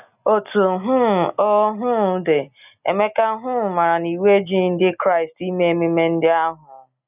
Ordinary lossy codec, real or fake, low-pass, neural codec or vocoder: none; real; 3.6 kHz; none